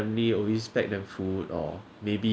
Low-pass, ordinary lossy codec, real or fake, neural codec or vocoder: none; none; real; none